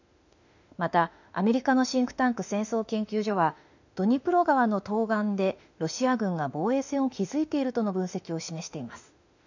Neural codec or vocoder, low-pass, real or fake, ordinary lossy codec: autoencoder, 48 kHz, 32 numbers a frame, DAC-VAE, trained on Japanese speech; 7.2 kHz; fake; none